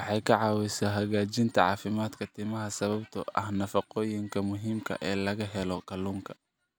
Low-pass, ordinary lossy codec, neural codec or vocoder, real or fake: none; none; none; real